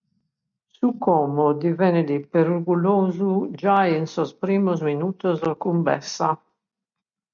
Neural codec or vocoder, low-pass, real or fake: none; 7.2 kHz; real